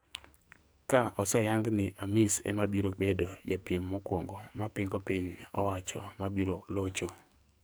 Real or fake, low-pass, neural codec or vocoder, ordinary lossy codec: fake; none; codec, 44.1 kHz, 2.6 kbps, SNAC; none